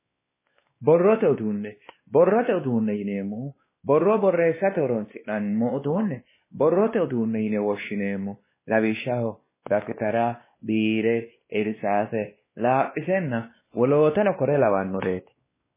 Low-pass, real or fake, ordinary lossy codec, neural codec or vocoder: 3.6 kHz; fake; MP3, 16 kbps; codec, 16 kHz, 2 kbps, X-Codec, WavLM features, trained on Multilingual LibriSpeech